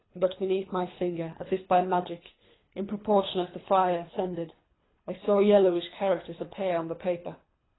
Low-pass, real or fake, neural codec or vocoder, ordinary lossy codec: 7.2 kHz; fake; codec, 24 kHz, 3 kbps, HILCodec; AAC, 16 kbps